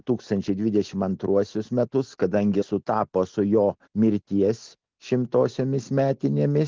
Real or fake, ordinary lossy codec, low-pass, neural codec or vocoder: real; Opus, 32 kbps; 7.2 kHz; none